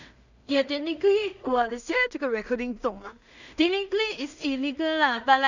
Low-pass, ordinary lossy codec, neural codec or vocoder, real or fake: 7.2 kHz; none; codec, 16 kHz in and 24 kHz out, 0.4 kbps, LongCat-Audio-Codec, two codebook decoder; fake